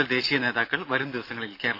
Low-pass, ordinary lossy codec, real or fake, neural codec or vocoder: 5.4 kHz; AAC, 32 kbps; real; none